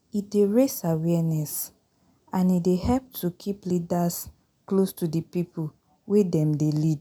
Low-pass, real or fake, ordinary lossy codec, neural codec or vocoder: none; real; none; none